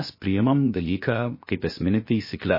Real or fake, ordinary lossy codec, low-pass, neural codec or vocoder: fake; MP3, 24 kbps; 5.4 kHz; codec, 16 kHz, 0.7 kbps, FocalCodec